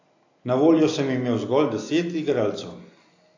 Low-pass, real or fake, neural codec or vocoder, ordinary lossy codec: 7.2 kHz; real; none; AAC, 48 kbps